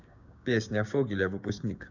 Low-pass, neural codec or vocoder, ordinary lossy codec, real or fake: 7.2 kHz; codec, 16 kHz, 6 kbps, DAC; none; fake